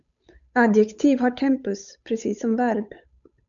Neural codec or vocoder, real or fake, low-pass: codec, 16 kHz, 8 kbps, FunCodec, trained on Chinese and English, 25 frames a second; fake; 7.2 kHz